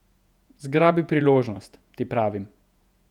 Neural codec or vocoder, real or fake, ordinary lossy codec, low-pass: vocoder, 48 kHz, 128 mel bands, Vocos; fake; none; 19.8 kHz